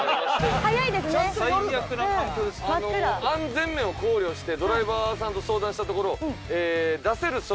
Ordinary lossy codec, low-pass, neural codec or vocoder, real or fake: none; none; none; real